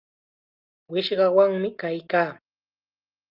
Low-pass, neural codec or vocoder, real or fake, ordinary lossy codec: 5.4 kHz; none; real; Opus, 32 kbps